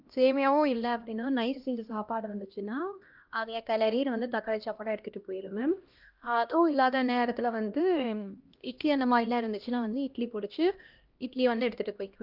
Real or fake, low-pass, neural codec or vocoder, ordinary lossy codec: fake; 5.4 kHz; codec, 16 kHz, 1 kbps, X-Codec, HuBERT features, trained on LibriSpeech; Opus, 32 kbps